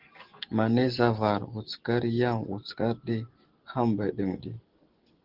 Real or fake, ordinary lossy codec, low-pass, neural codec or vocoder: real; Opus, 16 kbps; 5.4 kHz; none